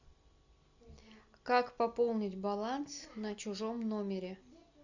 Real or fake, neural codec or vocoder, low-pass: real; none; 7.2 kHz